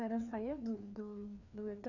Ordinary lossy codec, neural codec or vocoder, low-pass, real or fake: none; codec, 16 kHz, 2 kbps, FreqCodec, larger model; 7.2 kHz; fake